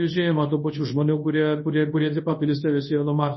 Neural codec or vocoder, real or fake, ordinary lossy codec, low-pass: codec, 24 kHz, 0.9 kbps, WavTokenizer, large speech release; fake; MP3, 24 kbps; 7.2 kHz